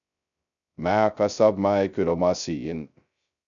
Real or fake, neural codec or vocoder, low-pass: fake; codec, 16 kHz, 0.2 kbps, FocalCodec; 7.2 kHz